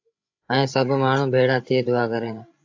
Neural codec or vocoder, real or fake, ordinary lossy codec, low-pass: codec, 16 kHz, 16 kbps, FreqCodec, larger model; fake; AAC, 48 kbps; 7.2 kHz